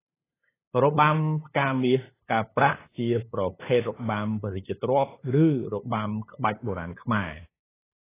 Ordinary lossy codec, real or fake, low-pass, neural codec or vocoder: AAC, 16 kbps; fake; 3.6 kHz; codec, 16 kHz, 8 kbps, FunCodec, trained on LibriTTS, 25 frames a second